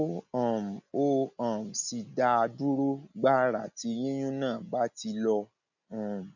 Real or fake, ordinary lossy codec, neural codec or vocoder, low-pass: real; none; none; 7.2 kHz